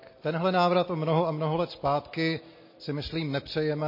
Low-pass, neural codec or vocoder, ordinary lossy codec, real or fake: 5.4 kHz; none; MP3, 24 kbps; real